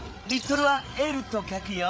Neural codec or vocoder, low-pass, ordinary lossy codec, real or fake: codec, 16 kHz, 8 kbps, FreqCodec, larger model; none; none; fake